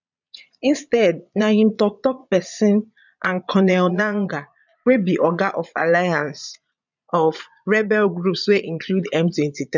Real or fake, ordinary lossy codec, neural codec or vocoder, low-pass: fake; none; vocoder, 22.05 kHz, 80 mel bands, Vocos; 7.2 kHz